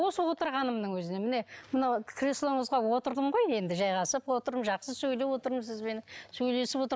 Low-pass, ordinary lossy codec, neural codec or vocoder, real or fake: none; none; none; real